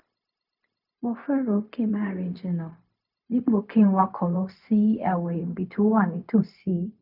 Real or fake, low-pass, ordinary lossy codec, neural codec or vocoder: fake; 5.4 kHz; none; codec, 16 kHz, 0.4 kbps, LongCat-Audio-Codec